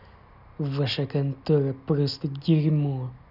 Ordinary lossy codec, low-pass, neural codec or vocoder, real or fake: none; 5.4 kHz; none; real